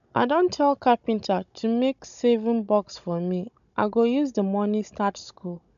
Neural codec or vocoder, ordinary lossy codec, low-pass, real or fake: codec, 16 kHz, 16 kbps, FunCodec, trained on Chinese and English, 50 frames a second; none; 7.2 kHz; fake